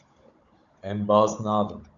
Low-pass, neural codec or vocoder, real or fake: 7.2 kHz; codec, 16 kHz, 16 kbps, FunCodec, trained on Chinese and English, 50 frames a second; fake